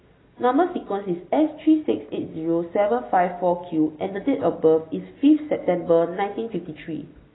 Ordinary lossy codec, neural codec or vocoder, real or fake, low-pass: AAC, 16 kbps; none; real; 7.2 kHz